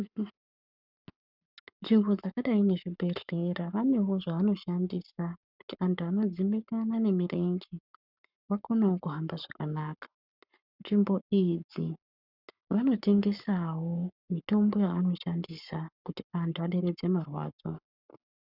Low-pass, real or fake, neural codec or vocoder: 5.4 kHz; fake; codec, 44.1 kHz, 7.8 kbps, Pupu-Codec